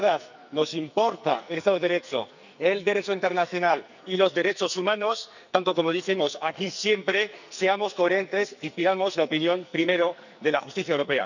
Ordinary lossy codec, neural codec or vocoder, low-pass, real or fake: none; codec, 44.1 kHz, 2.6 kbps, SNAC; 7.2 kHz; fake